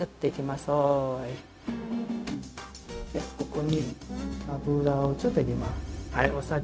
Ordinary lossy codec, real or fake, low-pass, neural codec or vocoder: none; fake; none; codec, 16 kHz, 0.4 kbps, LongCat-Audio-Codec